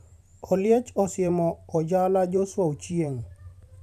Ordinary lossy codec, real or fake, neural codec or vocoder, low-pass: none; fake; vocoder, 44.1 kHz, 128 mel bands every 512 samples, BigVGAN v2; 14.4 kHz